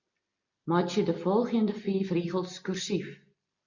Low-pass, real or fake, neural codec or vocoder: 7.2 kHz; real; none